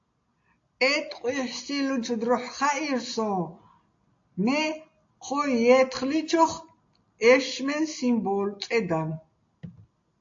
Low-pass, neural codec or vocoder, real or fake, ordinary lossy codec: 7.2 kHz; none; real; AAC, 48 kbps